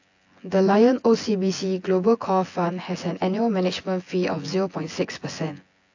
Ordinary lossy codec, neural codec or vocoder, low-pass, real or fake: AAC, 48 kbps; vocoder, 24 kHz, 100 mel bands, Vocos; 7.2 kHz; fake